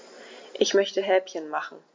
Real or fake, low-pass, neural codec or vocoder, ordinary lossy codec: real; 7.2 kHz; none; MP3, 48 kbps